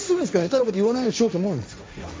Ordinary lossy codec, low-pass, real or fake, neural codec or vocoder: none; none; fake; codec, 16 kHz, 1.1 kbps, Voila-Tokenizer